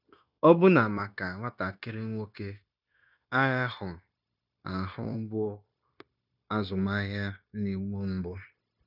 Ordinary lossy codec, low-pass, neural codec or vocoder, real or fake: none; 5.4 kHz; codec, 16 kHz, 0.9 kbps, LongCat-Audio-Codec; fake